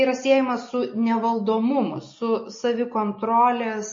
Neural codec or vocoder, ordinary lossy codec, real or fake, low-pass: none; MP3, 32 kbps; real; 7.2 kHz